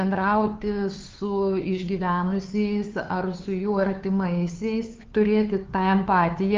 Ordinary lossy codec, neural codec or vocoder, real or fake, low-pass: Opus, 24 kbps; codec, 16 kHz, 2 kbps, FunCodec, trained on Chinese and English, 25 frames a second; fake; 7.2 kHz